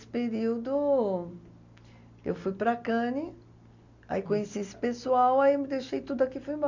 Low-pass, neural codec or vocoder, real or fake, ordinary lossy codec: 7.2 kHz; none; real; none